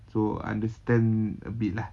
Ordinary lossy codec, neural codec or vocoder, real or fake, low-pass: none; none; real; none